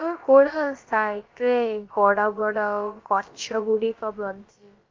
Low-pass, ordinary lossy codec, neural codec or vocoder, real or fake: 7.2 kHz; Opus, 32 kbps; codec, 16 kHz, about 1 kbps, DyCAST, with the encoder's durations; fake